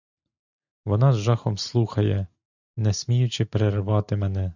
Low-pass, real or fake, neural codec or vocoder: 7.2 kHz; real; none